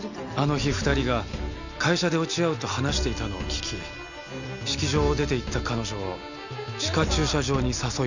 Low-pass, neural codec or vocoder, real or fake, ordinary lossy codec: 7.2 kHz; none; real; none